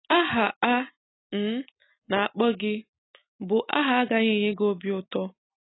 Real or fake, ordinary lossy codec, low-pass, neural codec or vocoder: real; AAC, 16 kbps; 7.2 kHz; none